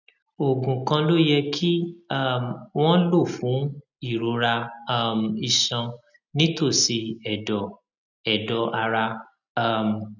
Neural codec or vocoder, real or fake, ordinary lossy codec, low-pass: none; real; none; 7.2 kHz